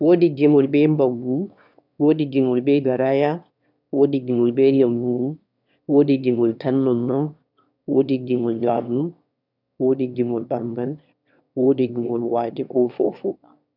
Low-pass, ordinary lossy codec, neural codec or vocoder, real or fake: 5.4 kHz; none; autoencoder, 22.05 kHz, a latent of 192 numbers a frame, VITS, trained on one speaker; fake